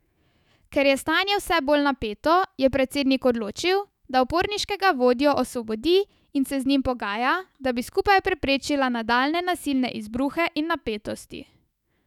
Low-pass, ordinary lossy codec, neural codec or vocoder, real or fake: 19.8 kHz; none; autoencoder, 48 kHz, 128 numbers a frame, DAC-VAE, trained on Japanese speech; fake